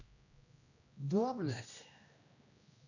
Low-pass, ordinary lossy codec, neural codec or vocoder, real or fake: 7.2 kHz; none; codec, 16 kHz, 1 kbps, X-Codec, HuBERT features, trained on general audio; fake